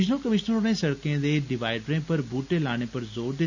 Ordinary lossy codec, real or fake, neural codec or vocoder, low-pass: none; real; none; 7.2 kHz